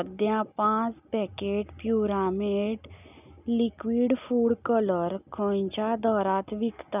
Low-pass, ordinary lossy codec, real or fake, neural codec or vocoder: 3.6 kHz; none; real; none